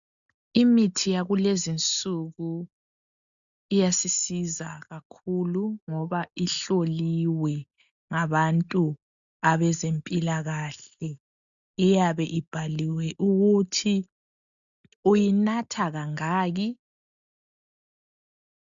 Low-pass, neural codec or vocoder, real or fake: 7.2 kHz; none; real